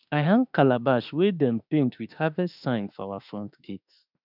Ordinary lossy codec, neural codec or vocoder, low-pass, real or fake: none; autoencoder, 48 kHz, 32 numbers a frame, DAC-VAE, trained on Japanese speech; 5.4 kHz; fake